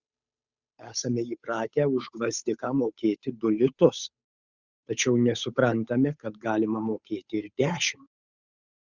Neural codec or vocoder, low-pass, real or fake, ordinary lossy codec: codec, 16 kHz, 8 kbps, FunCodec, trained on Chinese and English, 25 frames a second; 7.2 kHz; fake; Opus, 64 kbps